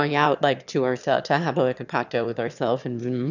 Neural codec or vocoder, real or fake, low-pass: autoencoder, 22.05 kHz, a latent of 192 numbers a frame, VITS, trained on one speaker; fake; 7.2 kHz